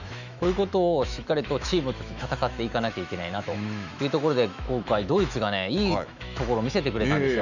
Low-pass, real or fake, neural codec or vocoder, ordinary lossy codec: 7.2 kHz; fake; autoencoder, 48 kHz, 128 numbers a frame, DAC-VAE, trained on Japanese speech; none